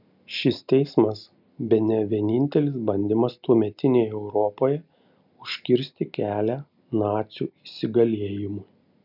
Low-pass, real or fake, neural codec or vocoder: 5.4 kHz; real; none